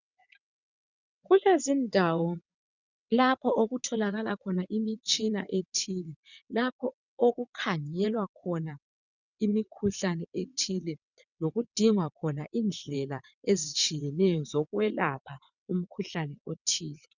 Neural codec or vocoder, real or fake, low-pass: vocoder, 22.05 kHz, 80 mel bands, WaveNeXt; fake; 7.2 kHz